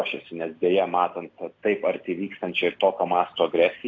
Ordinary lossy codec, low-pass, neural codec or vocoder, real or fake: AAC, 48 kbps; 7.2 kHz; none; real